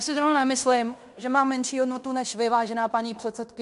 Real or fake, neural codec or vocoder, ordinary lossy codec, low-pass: fake; codec, 16 kHz in and 24 kHz out, 0.9 kbps, LongCat-Audio-Codec, fine tuned four codebook decoder; MP3, 64 kbps; 10.8 kHz